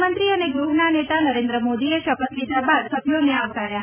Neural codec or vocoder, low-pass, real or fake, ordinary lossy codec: none; 3.6 kHz; real; none